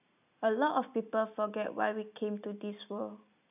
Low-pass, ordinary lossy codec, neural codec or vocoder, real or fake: 3.6 kHz; none; none; real